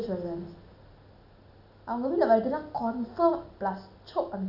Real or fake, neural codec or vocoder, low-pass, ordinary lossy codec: real; none; 5.4 kHz; none